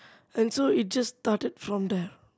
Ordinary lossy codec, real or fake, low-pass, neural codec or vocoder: none; real; none; none